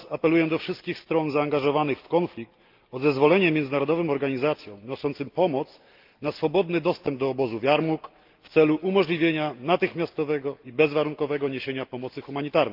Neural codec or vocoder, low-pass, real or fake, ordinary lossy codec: none; 5.4 kHz; real; Opus, 32 kbps